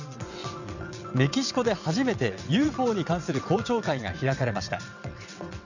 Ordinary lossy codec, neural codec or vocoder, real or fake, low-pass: none; vocoder, 22.05 kHz, 80 mel bands, WaveNeXt; fake; 7.2 kHz